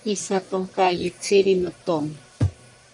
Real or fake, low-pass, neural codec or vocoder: fake; 10.8 kHz; codec, 44.1 kHz, 1.7 kbps, Pupu-Codec